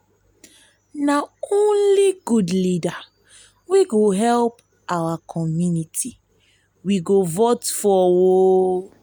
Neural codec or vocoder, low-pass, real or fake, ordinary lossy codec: none; none; real; none